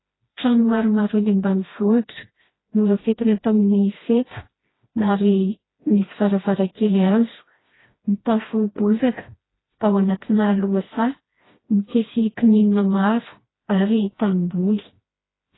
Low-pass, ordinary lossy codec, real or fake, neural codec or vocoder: 7.2 kHz; AAC, 16 kbps; fake; codec, 16 kHz, 1 kbps, FreqCodec, smaller model